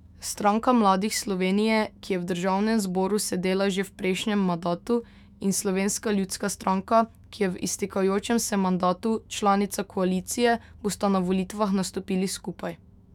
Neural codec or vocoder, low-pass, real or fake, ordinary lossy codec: autoencoder, 48 kHz, 128 numbers a frame, DAC-VAE, trained on Japanese speech; 19.8 kHz; fake; none